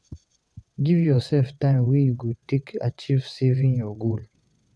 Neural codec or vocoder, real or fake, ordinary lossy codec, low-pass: vocoder, 22.05 kHz, 80 mel bands, WaveNeXt; fake; none; none